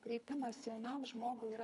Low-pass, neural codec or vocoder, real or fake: 10.8 kHz; codec, 24 kHz, 3 kbps, HILCodec; fake